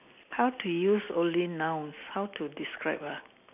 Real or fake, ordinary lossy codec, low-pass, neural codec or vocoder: real; none; 3.6 kHz; none